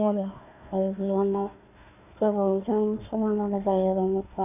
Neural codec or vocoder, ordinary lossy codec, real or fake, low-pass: codec, 16 kHz, 1 kbps, FunCodec, trained on Chinese and English, 50 frames a second; none; fake; 3.6 kHz